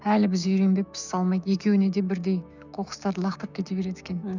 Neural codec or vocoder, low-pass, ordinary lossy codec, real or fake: codec, 16 kHz, 6 kbps, DAC; 7.2 kHz; none; fake